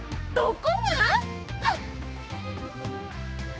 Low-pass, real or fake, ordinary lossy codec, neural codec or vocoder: none; fake; none; codec, 16 kHz, 2 kbps, X-Codec, HuBERT features, trained on general audio